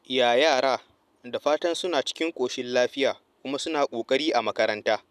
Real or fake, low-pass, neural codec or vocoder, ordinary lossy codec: real; 14.4 kHz; none; none